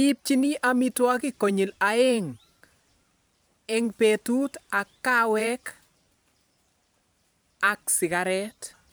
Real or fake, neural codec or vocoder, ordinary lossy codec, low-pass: fake; vocoder, 44.1 kHz, 128 mel bands every 512 samples, BigVGAN v2; none; none